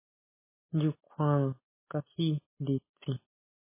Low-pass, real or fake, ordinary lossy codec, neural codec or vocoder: 3.6 kHz; real; MP3, 16 kbps; none